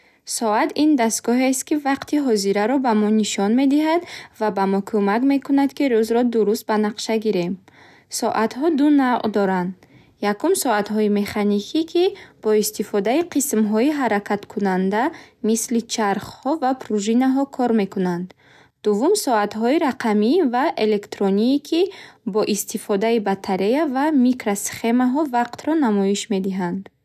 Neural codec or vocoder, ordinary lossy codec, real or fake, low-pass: none; none; real; 14.4 kHz